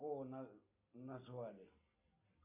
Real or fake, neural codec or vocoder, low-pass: real; none; 3.6 kHz